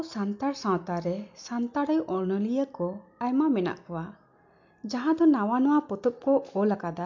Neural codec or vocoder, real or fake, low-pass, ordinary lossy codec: none; real; 7.2 kHz; MP3, 48 kbps